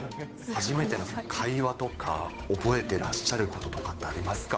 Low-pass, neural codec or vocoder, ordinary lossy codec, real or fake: none; codec, 16 kHz, 8 kbps, FunCodec, trained on Chinese and English, 25 frames a second; none; fake